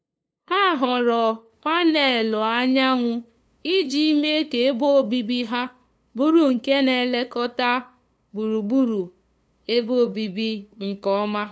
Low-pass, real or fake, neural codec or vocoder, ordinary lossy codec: none; fake; codec, 16 kHz, 2 kbps, FunCodec, trained on LibriTTS, 25 frames a second; none